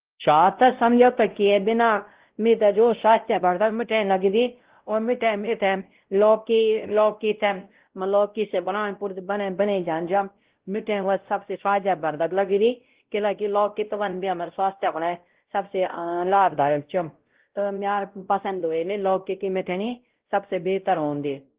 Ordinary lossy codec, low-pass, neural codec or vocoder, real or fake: Opus, 16 kbps; 3.6 kHz; codec, 16 kHz, 0.5 kbps, X-Codec, WavLM features, trained on Multilingual LibriSpeech; fake